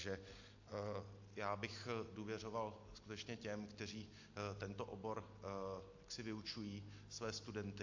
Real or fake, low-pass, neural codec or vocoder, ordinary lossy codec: real; 7.2 kHz; none; AAC, 48 kbps